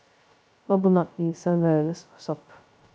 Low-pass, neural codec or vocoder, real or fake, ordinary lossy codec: none; codec, 16 kHz, 0.2 kbps, FocalCodec; fake; none